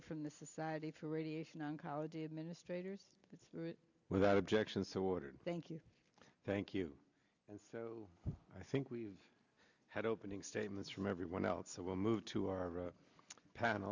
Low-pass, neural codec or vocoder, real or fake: 7.2 kHz; none; real